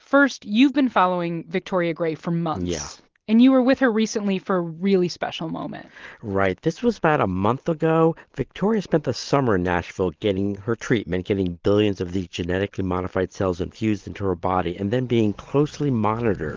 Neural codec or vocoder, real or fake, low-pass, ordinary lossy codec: none; real; 7.2 kHz; Opus, 16 kbps